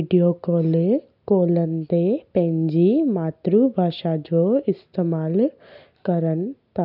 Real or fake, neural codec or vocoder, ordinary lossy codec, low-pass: real; none; none; 5.4 kHz